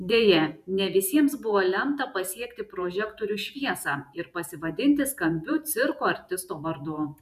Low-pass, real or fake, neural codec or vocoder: 14.4 kHz; fake; vocoder, 48 kHz, 128 mel bands, Vocos